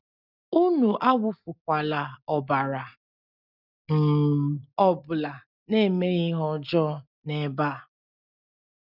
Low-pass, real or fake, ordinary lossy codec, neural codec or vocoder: 5.4 kHz; real; none; none